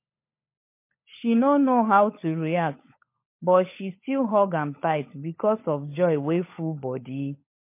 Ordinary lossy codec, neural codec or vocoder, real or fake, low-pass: MP3, 24 kbps; codec, 16 kHz, 16 kbps, FunCodec, trained on LibriTTS, 50 frames a second; fake; 3.6 kHz